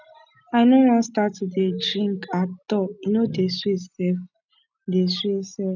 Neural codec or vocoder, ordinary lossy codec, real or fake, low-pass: none; none; real; 7.2 kHz